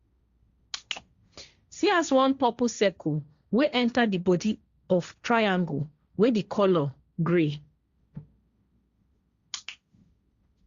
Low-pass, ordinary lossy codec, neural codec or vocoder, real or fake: 7.2 kHz; Opus, 64 kbps; codec, 16 kHz, 1.1 kbps, Voila-Tokenizer; fake